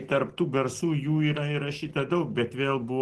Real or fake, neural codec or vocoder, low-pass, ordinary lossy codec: real; none; 10.8 kHz; Opus, 16 kbps